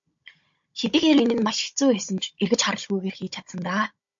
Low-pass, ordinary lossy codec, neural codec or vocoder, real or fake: 7.2 kHz; MP3, 48 kbps; codec, 16 kHz, 16 kbps, FunCodec, trained on Chinese and English, 50 frames a second; fake